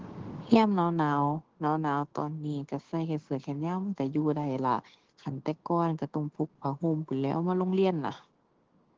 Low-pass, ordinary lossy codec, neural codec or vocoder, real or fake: 7.2 kHz; Opus, 16 kbps; codec, 16 kHz, 6 kbps, DAC; fake